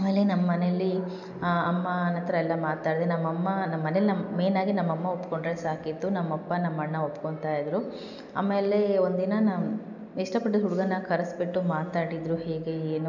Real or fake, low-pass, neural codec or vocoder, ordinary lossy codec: real; 7.2 kHz; none; none